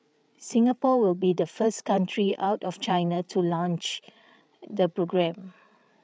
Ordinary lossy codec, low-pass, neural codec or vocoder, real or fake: none; none; codec, 16 kHz, 8 kbps, FreqCodec, larger model; fake